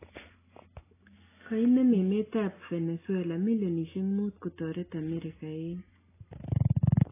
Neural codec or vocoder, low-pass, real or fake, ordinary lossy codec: none; 3.6 kHz; real; AAC, 16 kbps